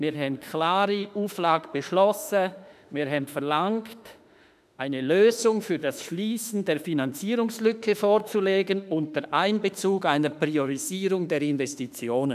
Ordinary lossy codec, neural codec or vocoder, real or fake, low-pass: none; autoencoder, 48 kHz, 32 numbers a frame, DAC-VAE, trained on Japanese speech; fake; 14.4 kHz